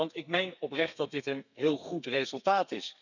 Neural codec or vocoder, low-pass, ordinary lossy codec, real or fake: codec, 32 kHz, 1.9 kbps, SNAC; 7.2 kHz; none; fake